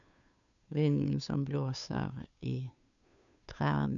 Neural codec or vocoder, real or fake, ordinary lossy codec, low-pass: codec, 16 kHz, 2 kbps, FunCodec, trained on Chinese and English, 25 frames a second; fake; none; 7.2 kHz